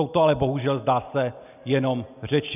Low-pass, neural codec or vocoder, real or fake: 3.6 kHz; none; real